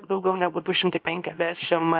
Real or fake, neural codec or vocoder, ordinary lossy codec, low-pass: fake; codec, 24 kHz, 0.9 kbps, WavTokenizer, small release; Opus, 24 kbps; 5.4 kHz